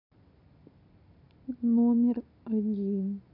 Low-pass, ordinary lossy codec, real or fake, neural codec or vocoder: 5.4 kHz; none; real; none